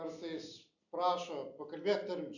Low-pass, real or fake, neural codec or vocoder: 7.2 kHz; real; none